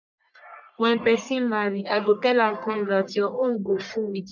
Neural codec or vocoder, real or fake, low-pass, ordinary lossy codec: codec, 44.1 kHz, 1.7 kbps, Pupu-Codec; fake; 7.2 kHz; none